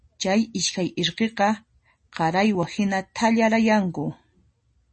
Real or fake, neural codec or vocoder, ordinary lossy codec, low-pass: real; none; MP3, 32 kbps; 10.8 kHz